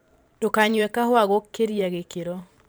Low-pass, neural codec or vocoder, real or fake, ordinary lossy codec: none; vocoder, 44.1 kHz, 128 mel bands every 512 samples, BigVGAN v2; fake; none